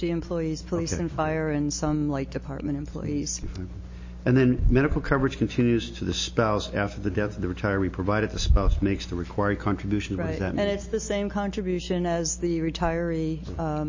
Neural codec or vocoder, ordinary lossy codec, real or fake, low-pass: none; MP3, 32 kbps; real; 7.2 kHz